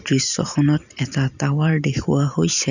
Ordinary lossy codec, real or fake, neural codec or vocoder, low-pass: none; fake; vocoder, 44.1 kHz, 128 mel bands every 512 samples, BigVGAN v2; 7.2 kHz